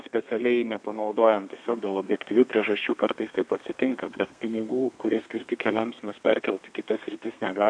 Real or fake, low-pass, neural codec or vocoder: fake; 9.9 kHz; codec, 32 kHz, 1.9 kbps, SNAC